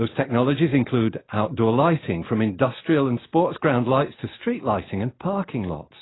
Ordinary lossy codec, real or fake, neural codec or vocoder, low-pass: AAC, 16 kbps; real; none; 7.2 kHz